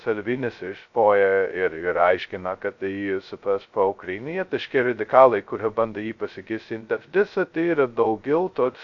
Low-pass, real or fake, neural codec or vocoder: 7.2 kHz; fake; codec, 16 kHz, 0.2 kbps, FocalCodec